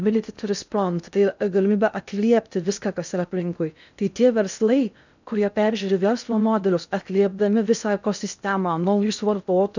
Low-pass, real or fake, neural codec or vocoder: 7.2 kHz; fake; codec, 16 kHz in and 24 kHz out, 0.6 kbps, FocalCodec, streaming, 2048 codes